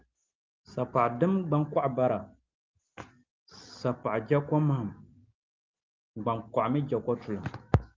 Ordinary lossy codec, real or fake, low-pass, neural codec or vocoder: Opus, 24 kbps; real; 7.2 kHz; none